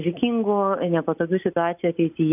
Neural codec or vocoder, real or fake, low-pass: none; real; 3.6 kHz